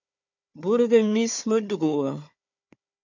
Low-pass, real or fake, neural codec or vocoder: 7.2 kHz; fake; codec, 16 kHz, 4 kbps, FunCodec, trained on Chinese and English, 50 frames a second